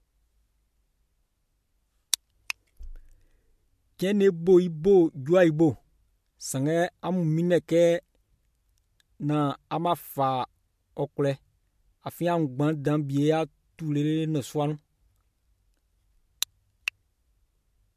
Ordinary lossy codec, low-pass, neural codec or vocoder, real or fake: MP3, 64 kbps; 14.4 kHz; none; real